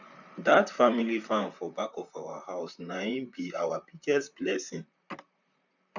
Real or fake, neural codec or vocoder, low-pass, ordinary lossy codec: fake; vocoder, 44.1 kHz, 128 mel bands, Pupu-Vocoder; 7.2 kHz; none